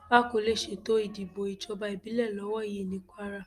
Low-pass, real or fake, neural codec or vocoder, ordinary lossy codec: 14.4 kHz; real; none; Opus, 32 kbps